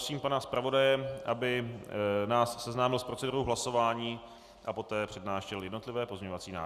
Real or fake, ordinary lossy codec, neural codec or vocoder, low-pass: real; Opus, 64 kbps; none; 14.4 kHz